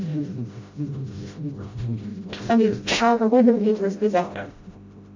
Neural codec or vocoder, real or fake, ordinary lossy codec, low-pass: codec, 16 kHz, 0.5 kbps, FreqCodec, smaller model; fake; MP3, 48 kbps; 7.2 kHz